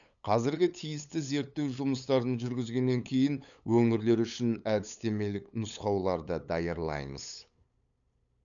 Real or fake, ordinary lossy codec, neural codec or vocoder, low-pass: fake; none; codec, 16 kHz, 8 kbps, FunCodec, trained on LibriTTS, 25 frames a second; 7.2 kHz